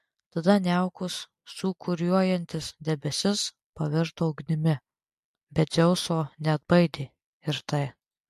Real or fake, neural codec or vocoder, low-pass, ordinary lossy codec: real; none; 14.4 kHz; MP3, 64 kbps